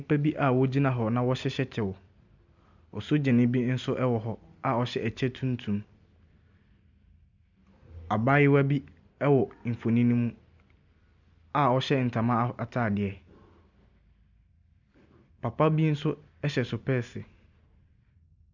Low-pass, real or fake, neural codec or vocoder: 7.2 kHz; real; none